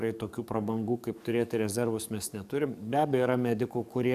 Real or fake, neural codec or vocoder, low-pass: fake; codec, 44.1 kHz, 7.8 kbps, DAC; 14.4 kHz